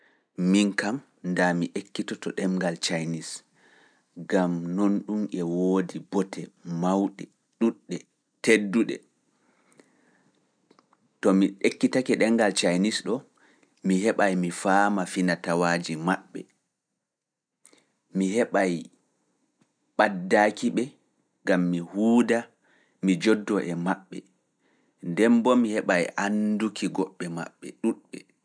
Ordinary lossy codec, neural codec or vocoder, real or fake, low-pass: none; none; real; 9.9 kHz